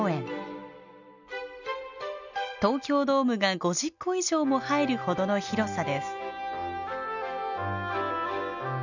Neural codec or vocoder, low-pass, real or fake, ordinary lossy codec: none; 7.2 kHz; real; none